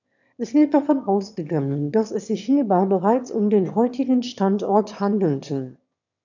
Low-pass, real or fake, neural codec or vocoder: 7.2 kHz; fake; autoencoder, 22.05 kHz, a latent of 192 numbers a frame, VITS, trained on one speaker